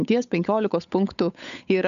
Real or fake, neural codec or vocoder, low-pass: fake; codec, 16 kHz, 8 kbps, FunCodec, trained on Chinese and English, 25 frames a second; 7.2 kHz